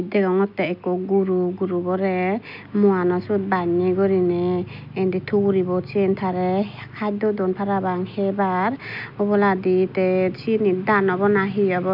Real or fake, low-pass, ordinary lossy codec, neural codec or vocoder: real; 5.4 kHz; none; none